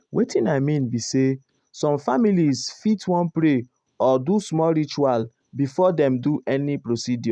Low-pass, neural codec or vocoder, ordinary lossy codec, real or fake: 9.9 kHz; none; none; real